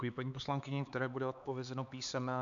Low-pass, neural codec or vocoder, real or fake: 7.2 kHz; codec, 16 kHz, 4 kbps, X-Codec, HuBERT features, trained on LibriSpeech; fake